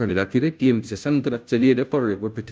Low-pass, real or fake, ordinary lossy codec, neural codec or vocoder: none; fake; none; codec, 16 kHz, 0.5 kbps, FunCodec, trained on Chinese and English, 25 frames a second